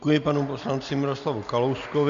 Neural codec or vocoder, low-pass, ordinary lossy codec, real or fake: none; 7.2 kHz; MP3, 64 kbps; real